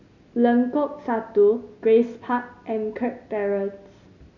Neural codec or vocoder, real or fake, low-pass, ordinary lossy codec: none; real; 7.2 kHz; none